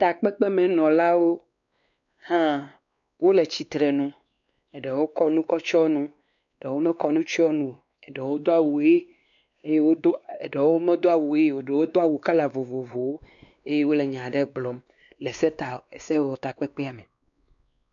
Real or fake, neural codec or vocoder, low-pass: fake; codec, 16 kHz, 2 kbps, X-Codec, WavLM features, trained on Multilingual LibriSpeech; 7.2 kHz